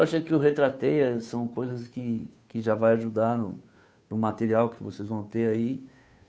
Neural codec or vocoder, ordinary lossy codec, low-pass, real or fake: codec, 16 kHz, 2 kbps, FunCodec, trained on Chinese and English, 25 frames a second; none; none; fake